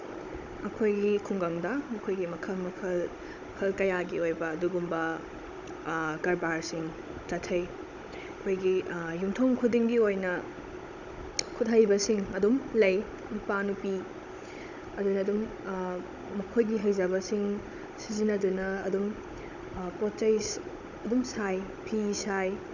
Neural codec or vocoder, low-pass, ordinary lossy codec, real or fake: codec, 16 kHz, 16 kbps, FunCodec, trained on Chinese and English, 50 frames a second; 7.2 kHz; none; fake